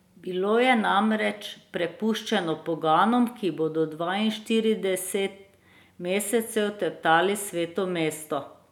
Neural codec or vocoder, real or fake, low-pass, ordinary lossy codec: none; real; 19.8 kHz; none